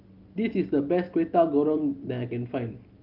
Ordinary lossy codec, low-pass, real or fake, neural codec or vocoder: Opus, 24 kbps; 5.4 kHz; real; none